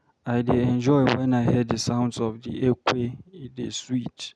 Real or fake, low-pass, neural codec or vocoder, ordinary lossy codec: real; none; none; none